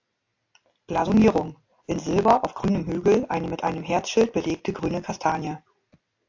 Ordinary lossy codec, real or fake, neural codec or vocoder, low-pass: AAC, 48 kbps; real; none; 7.2 kHz